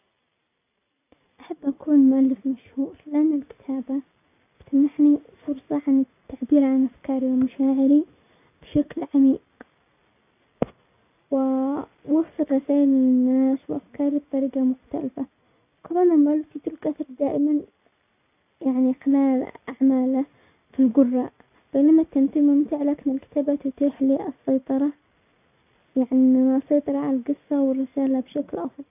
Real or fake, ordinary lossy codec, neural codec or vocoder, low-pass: real; none; none; 3.6 kHz